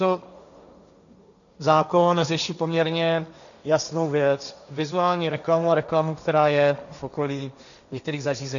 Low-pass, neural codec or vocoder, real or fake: 7.2 kHz; codec, 16 kHz, 1.1 kbps, Voila-Tokenizer; fake